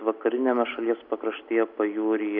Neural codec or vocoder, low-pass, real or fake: none; 5.4 kHz; real